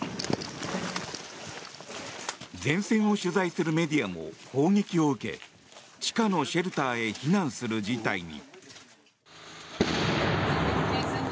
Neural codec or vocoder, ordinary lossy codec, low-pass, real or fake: none; none; none; real